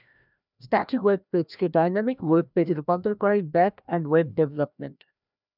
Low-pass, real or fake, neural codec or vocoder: 5.4 kHz; fake; codec, 16 kHz, 1 kbps, FreqCodec, larger model